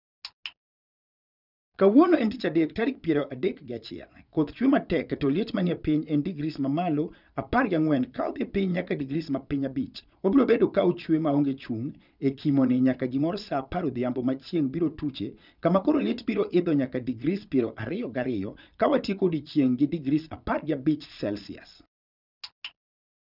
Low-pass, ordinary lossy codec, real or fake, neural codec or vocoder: 5.4 kHz; none; fake; vocoder, 22.05 kHz, 80 mel bands, Vocos